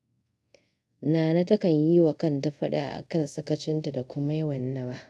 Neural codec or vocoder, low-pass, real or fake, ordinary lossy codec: codec, 24 kHz, 0.5 kbps, DualCodec; none; fake; none